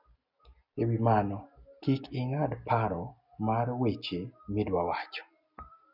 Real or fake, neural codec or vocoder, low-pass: real; none; 5.4 kHz